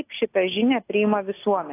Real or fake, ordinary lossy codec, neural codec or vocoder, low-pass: real; AAC, 24 kbps; none; 3.6 kHz